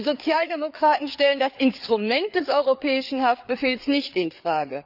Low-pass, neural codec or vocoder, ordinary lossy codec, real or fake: 5.4 kHz; codec, 16 kHz, 4 kbps, FreqCodec, larger model; none; fake